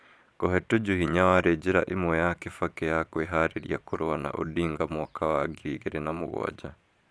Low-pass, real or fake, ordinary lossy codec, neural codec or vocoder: none; fake; none; vocoder, 22.05 kHz, 80 mel bands, Vocos